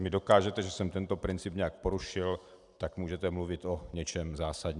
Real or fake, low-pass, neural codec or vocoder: real; 10.8 kHz; none